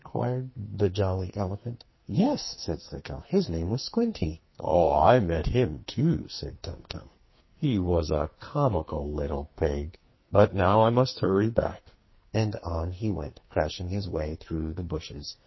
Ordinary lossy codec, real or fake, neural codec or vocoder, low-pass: MP3, 24 kbps; fake; codec, 44.1 kHz, 2.6 kbps, SNAC; 7.2 kHz